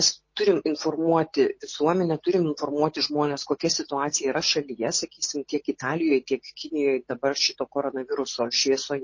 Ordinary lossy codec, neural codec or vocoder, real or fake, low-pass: MP3, 32 kbps; none; real; 7.2 kHz